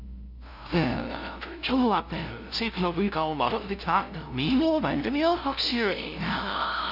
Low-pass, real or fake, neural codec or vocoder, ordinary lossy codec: 5.4 kHz; fake; codec, 16 kHz, 0.5 kbps, FunCodec, trained on LibriTTS, 25 frames a second; none